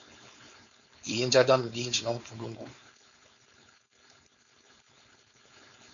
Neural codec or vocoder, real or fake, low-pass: codec, 16 kHz, 4.8 kbps, FACodec; fake; 7.2 kHz